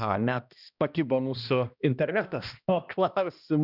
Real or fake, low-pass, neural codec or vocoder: fake; 5.4 kHz; codec, 16 kHz, 1 kbps, X-Codec, HuBERT features, trained on balanced general audio